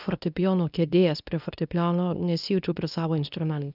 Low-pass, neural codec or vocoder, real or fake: 5.4 kHz; codec, 24 kHz, 0.9 kbps, WavTokenizer, medium speech release version 2; fake